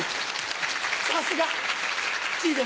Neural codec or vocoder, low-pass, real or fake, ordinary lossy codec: none; none; real; none